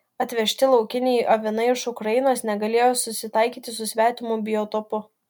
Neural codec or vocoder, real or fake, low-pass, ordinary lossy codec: none; real; 19.8 kHz; MP3, 96 kbps